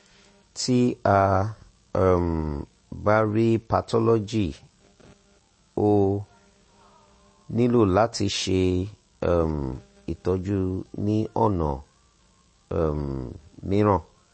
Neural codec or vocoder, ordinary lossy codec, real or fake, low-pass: autoencoder, 48 kHz, 128 numbers a frame, DAC-VAE, trained on Japanese speech; MP3, 32 kbps; fake; 9.9 kHz